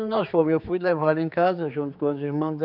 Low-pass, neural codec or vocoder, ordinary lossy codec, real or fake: 5.4 kHz; codec, 16 kHz, 4 kbps, X-Codec, HuBERT features, trained on general audio; none; fake